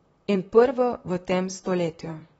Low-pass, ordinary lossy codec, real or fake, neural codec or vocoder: 19.8 kHz; AAC, 24 kbps; fake; vocoder, 44.1 kHz, 128 mel bands, Pupu-Vocoder